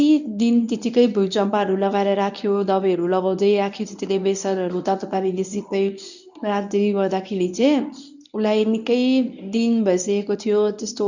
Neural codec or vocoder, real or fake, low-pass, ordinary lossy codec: codec, 24 kHz, 0.9 kbps, WavTokenizer, medium speech release version 1; fake; 7.2 kHz; none